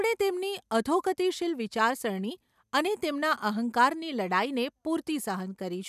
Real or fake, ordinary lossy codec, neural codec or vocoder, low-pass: real; none; none; 14.4 kHz